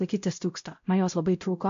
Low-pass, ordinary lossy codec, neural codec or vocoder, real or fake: 7.2 kHz; MP3, 48 kbps; codec, 16 kHz, 0.5 kbps, X-Codec, WavLM features, trained on Multilingual LibriSpeech; fake